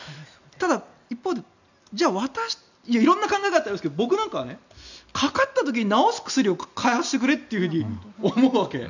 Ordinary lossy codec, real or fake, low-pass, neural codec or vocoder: none; real; 7.2 kHz; none